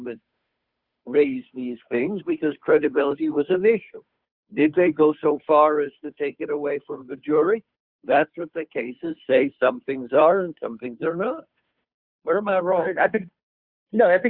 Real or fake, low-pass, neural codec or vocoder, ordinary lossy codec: fake; 5.4 kHz; codec, 16 kHz, 2 kbps, FunCodec, trained on Chinese and English, 25 frames a second; Opus, 64 kbps